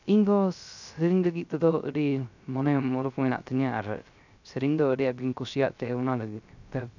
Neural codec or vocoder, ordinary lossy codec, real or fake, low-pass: codec, 16 kHz, 0.3 kbps, FocalCodec; none; fake; 7.2 kHz